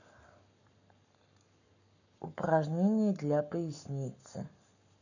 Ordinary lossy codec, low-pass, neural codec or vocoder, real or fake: none; 7.2 kHz; codec, 44.1 kHz, 7.8 kbps, Pupu-Codec; fake